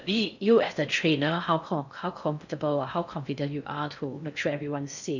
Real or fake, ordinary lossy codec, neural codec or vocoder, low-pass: fake; none; codec, 16 kHz in and 24 kHz out, 0.6 kbps, FocalCodec, streaming, 4096 codes; 7.2 kHz